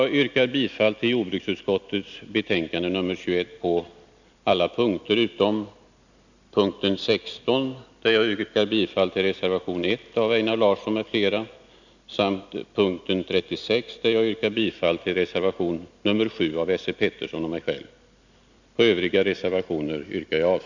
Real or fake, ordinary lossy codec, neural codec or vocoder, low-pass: real; none; none; 7.2 kHz